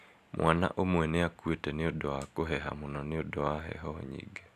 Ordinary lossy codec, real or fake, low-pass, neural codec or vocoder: none; real; 14.4 kHz; none